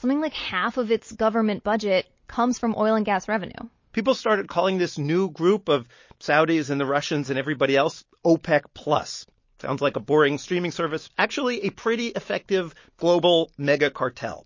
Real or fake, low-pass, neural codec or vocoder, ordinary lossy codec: real; 7.2 kHz; none; MP3, 32 kbps